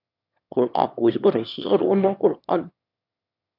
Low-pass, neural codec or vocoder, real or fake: 5.4 kHz; autoencoder, 22.05 kHz, a latent of 192 numbers a frame, VITS, trained on one speaker; fake